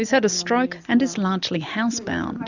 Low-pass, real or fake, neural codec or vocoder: 7.2 kHz; real; none